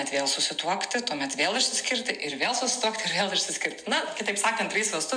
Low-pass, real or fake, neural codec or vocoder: 9.9 kHz; real; none